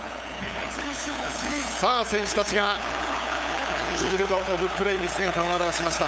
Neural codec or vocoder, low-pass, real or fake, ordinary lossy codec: codec, 16 kHz, 8 kbps, FunCodec, trained on LibriTTS, 25 frames a second; none; fake; none